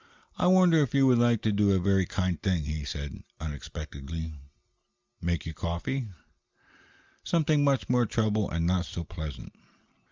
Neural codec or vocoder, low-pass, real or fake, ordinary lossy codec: none; 7.2 kHz; real; Opus, 32 kbps